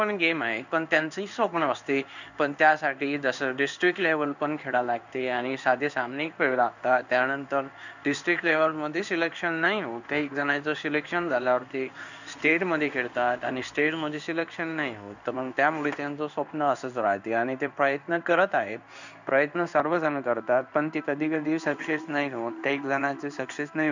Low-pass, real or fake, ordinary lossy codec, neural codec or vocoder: 7.2 kHz; fake; none; codec, 16 kHz in and 24 kHz out, 1 kbps, XY-Tokenizer